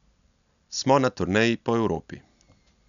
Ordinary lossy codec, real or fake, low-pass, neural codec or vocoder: none; real; 7.2 kHz; none